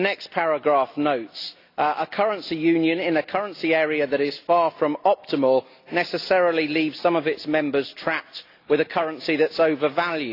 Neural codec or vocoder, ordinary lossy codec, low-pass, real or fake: none; AAC, 32 kbps; 5.4 kHz; real